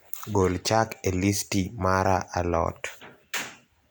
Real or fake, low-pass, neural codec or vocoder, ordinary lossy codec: real; none; none; none